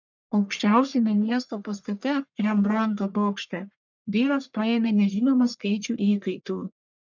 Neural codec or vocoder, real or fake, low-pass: codec, 44.1 kHz, 1.7 kbps, Pupu-Codec; fake; 7.2 kHz